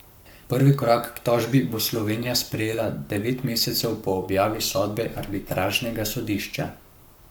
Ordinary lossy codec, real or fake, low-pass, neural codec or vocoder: none; fake; none; codec, 44.1 kHz, 7.8 kbps, Pupu-Codec